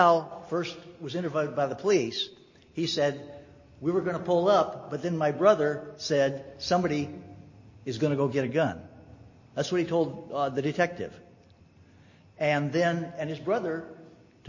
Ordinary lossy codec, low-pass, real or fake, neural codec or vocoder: MP3, 32 kbps; 7.2 kHz; real; none